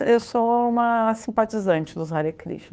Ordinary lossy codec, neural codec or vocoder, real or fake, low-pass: none; codec, 16 kHz, 2 kbps, FunCodec, trained on Chinese and English, 25 frames a second; fake; none